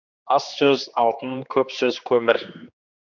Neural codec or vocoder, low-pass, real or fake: codec, 16 kHz, 2 kbps, X-Codec, HuBERT features, trained on general audio; 7.2 kHz; fake